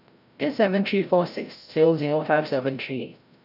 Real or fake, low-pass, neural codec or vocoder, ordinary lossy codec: fake; 5.4 kHz; codec, 16 kHz, 0.5 kbps, FreqCodec, larger model; none